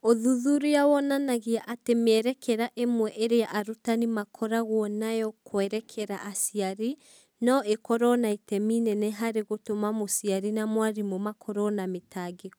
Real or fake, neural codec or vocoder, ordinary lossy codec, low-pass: real; none; none; none